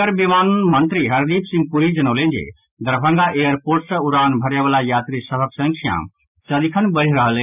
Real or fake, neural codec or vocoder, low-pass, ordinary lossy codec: real; none; 3.6 kHz; none